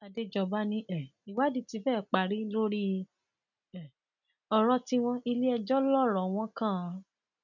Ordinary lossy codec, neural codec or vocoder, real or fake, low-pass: none; none; real; 7.2 kHz